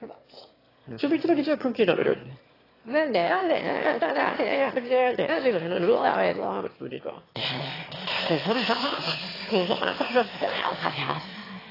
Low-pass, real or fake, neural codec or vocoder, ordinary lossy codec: 5.4 kHz; fake; autoencoder, 22.05 kHz, a latent of 192 numbers a frame, VITS, trained on one speaker; AAC, 24 kbps